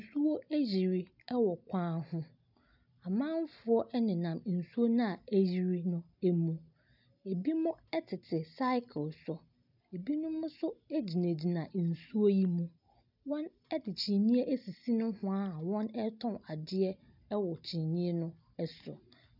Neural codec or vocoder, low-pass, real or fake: none; 5.4 kHz; real